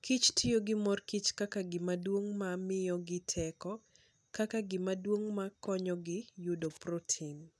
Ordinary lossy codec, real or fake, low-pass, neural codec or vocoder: none; real; none; none